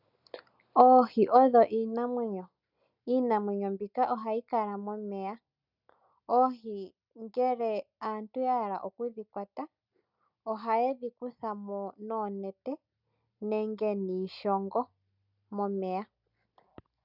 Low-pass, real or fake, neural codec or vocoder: 5.4 kHz; real; none